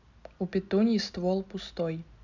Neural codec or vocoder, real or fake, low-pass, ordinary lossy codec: none; real; 7.2 kHz; none